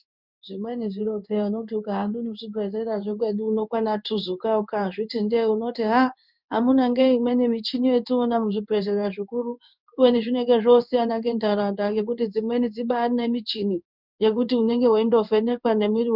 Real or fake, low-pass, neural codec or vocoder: fake; 5.4 kHz; codec, 16 kHz in and 24 kHz out, 1 kbps, XY-Tokenizer